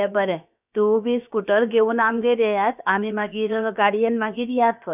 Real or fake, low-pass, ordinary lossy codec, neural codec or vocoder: fake; 3.6 kHz; none; codec, 16 kHz, about 1 kbps, DyCAST, with the encoder's durations